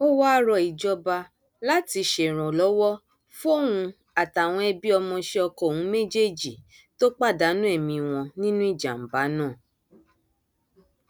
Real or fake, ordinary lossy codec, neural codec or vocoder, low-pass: real; none; none; none